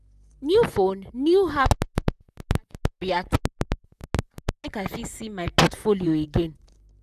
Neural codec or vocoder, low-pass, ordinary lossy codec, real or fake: vocoder, 44.1 kHz, 128 mel bands, Pupu-Vocoder; 14.4 kHz; none; fake